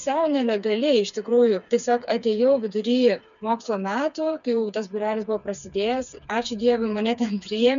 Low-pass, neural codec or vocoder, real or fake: 7.2 kHz; codec, 16 kHz, 4 kbps, FreqCodec, smaller model; fake